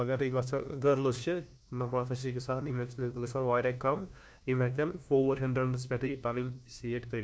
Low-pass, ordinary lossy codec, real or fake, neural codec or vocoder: none; none; fake; codec, 16 kHz, 1 kbps, FunCodec, trained on LibriTTS, 50 frames a second